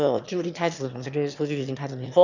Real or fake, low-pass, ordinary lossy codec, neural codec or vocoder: fake; 7.2 kHz; none; autoencoder, 22.05 kHz, a latent of 192 numbers a frame, VITS, trained on one speaker